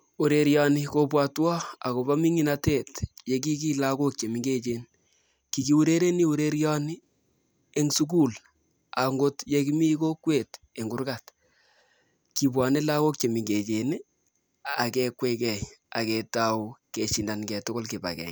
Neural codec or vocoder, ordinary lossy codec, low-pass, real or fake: none; none; none; real